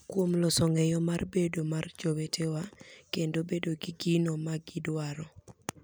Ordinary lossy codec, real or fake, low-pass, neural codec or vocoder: none; real; none; none